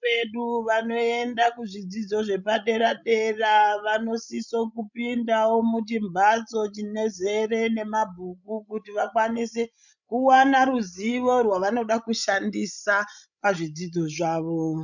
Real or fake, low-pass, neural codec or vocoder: fake; 7.2 kHz; codec, 16 kHz, 16 kbps, FreqCodec, larger model